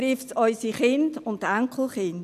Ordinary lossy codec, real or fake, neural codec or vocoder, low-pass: none; real; none; 14.4 kHz